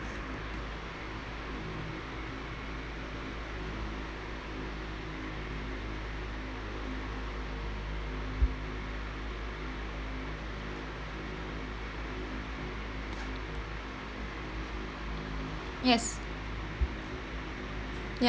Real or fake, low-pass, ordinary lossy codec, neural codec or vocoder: real; none; none; none